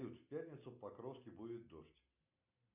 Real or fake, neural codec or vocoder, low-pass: real; none; 3.6 kHz